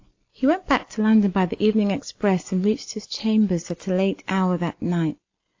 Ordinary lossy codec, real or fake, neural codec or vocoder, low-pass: AAC, 48 kbps; real; none; 7.2 kHz